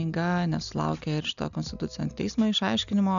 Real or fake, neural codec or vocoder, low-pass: real; none; 7.2 kHz